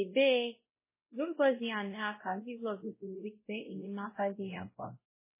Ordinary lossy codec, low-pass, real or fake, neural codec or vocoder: MP3, 16 kbps; 3.6 kHz; fake; codec, 16 kHz, 0.5 kbps, X-Codec, WavLM features, trained on Multilingual LibriSpeech